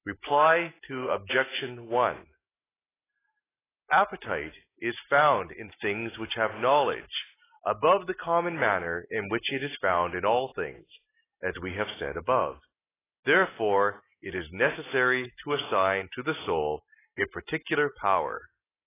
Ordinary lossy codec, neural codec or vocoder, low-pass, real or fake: AAC, 16 kbps; none; 3.6 kHz; real